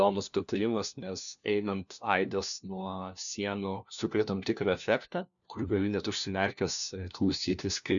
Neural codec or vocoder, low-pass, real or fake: codec, 16 kHz, 1 kbps, FunCodec, trained on LibriTTS, 50 frames a second; 7.2 kHz; fake